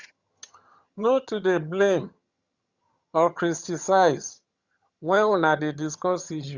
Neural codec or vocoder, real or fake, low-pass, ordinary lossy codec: vocoder, 22.05 kHz, 80 mel bands, HiFi-GAN; fake; 7.2 kHz; Opus, 64 kbps